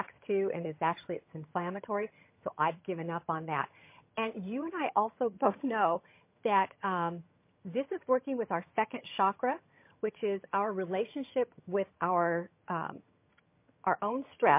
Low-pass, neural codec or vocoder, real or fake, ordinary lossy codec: 3.6 kHz; vocoder, 22.05 kHz, 80 mel bands, HiFi-GAN; fake; MP3, 24 kbps